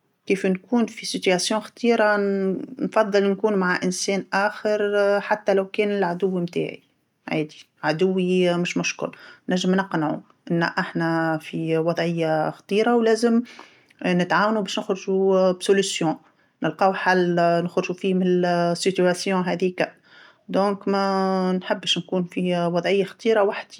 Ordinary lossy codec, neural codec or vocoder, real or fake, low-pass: none; none; real; 19.8 kHz